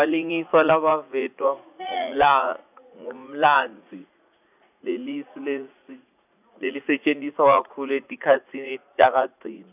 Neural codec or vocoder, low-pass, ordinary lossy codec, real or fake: vocoder, 44.1 kHz, 80 mel bands, Vocos; 3.6 kHz; none; fake